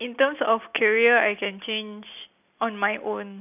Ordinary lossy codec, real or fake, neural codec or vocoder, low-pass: none; real; none; 3.6 kHz